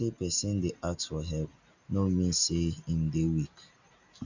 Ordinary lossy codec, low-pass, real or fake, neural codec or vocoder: none; 7.2 kHz; real; none